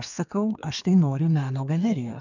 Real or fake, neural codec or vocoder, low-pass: fake; codec, 32 kHz, 1.9 kbps, SNAC; 7.2 kHz